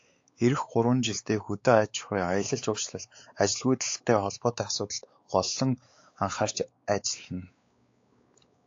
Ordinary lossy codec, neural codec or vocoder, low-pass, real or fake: AAC, 48 kbps; codec, 16 kHz, 4 kbps, X-Codec, WavLM features, trained on Multilingual LibriSpeech; 7.2 kHz; fake